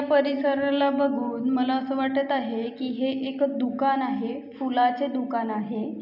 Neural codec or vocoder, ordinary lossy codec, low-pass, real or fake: none; none; 5.4 kHz; real